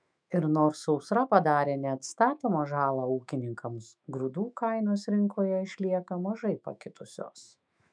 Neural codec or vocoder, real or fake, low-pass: autoencoder, 48 kHz, 128 numbers a frame, DAC-VAE, trained on Japanese speech; fake; 9.9 kHz